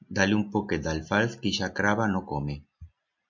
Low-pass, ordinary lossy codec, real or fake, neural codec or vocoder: 7.2 kHz; MP3, 64 kbps; real; none